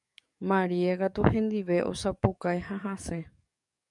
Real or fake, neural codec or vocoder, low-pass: fake; codec, 44.1 kHz, 7.8 kbps, DAC; 10.8 kHz